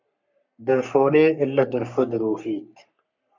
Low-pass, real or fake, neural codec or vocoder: 7.2 kHz; fake; codec, 44.1 kHz, 3.4 kbps, Pupu-Codec